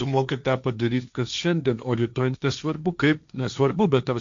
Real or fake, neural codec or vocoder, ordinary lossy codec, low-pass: fake; codec, 16 kHz, 1.1 kbps, Voila-Tokenizer; AAC, 64 kbps; 7.2 kHz